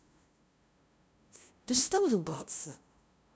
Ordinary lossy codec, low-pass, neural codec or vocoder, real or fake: none; none; codec, 16 kHz, 0.5 kbps, FunCodec, trained on LibriTTS, 25 frames a second; fake